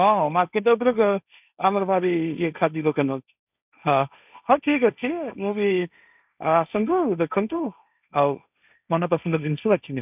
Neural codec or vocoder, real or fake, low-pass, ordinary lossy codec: codec, 16 kHz, 1.1 kbps, Voila-Tokenizer; fake; 3.6 kHz; none